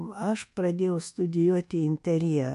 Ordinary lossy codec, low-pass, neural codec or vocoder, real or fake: MP3, 64 kbps; 10.8 kHz; codec, 24 kHz, 1.2 kbps, DualCodec; fake